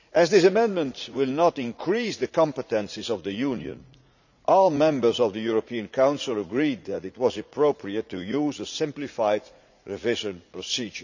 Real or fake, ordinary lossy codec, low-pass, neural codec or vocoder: fake; none; 7.2 kHz; vocoder, 44.1 kHz, 80 mel bands, Vocos